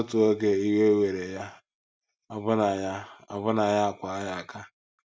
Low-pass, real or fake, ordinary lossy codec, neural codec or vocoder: none; real; none; none